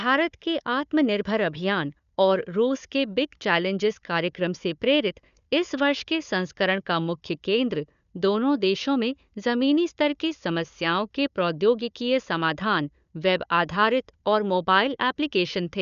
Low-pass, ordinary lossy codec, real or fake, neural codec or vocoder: 7.2 kHz; none; fake; codec, 16 kHz, 4 kbps, FunCodec, trained on Chinese and English, 50 frames a second